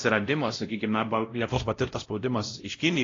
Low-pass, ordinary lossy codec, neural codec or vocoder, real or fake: 7.2 kHz; AAC, 32 kbps; codec, 16 kHz, 0.5 kbps, X-Codec, WavLM features, trained on Multilingual LibriSpeech; fake